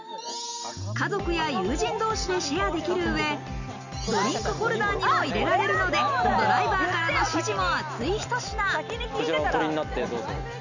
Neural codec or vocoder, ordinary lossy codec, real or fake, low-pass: none; none; real; 7.2 kHz